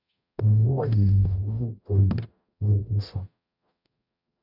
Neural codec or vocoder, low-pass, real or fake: codec, 44.1 kHz, 0.9 kbps, DAC; 5.4 kHz; fake